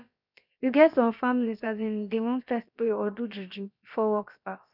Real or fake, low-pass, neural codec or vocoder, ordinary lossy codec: fake; 5.4 kHz; codec, 16 kHz, about 1 kbps, DyCAST, with the encoder's durations; none